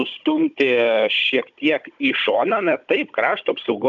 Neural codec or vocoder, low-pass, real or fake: codec, 16 kHz, 16 kbps, FunCodec, trained on Chinese and English, 50 frames a second; 7.2 kHz; fake